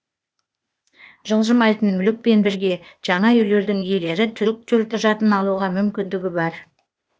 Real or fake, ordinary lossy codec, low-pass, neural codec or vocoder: fake; none; none; codec, 16 kHz, 0.8 kbps, ZipCodec